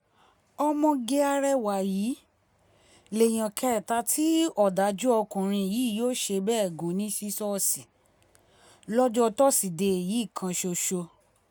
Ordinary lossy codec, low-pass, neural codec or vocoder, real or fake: none; none; none; real